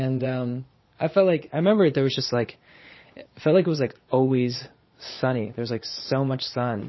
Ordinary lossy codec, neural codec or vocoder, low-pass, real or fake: MP3, 24 kbps; vocoder, 22.05 kHz, 80 mel bands, Vocos; 7.2 kHz; fake